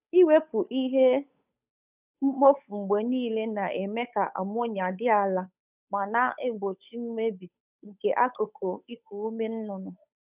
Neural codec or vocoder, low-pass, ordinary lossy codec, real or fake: codec, 16 kHz, 8 kbps, FunCodec, trained on Chinese and English, 25 frames a second; 3.6 kHz; none; fake